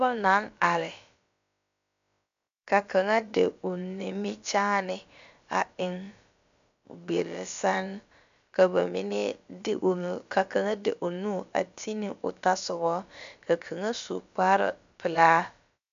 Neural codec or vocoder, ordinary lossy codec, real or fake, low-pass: codec, 16 kHz, about 1 kbps, DyCAST, with the encoder's durations; MP3, 64 kbps; fake; 7.2 kHz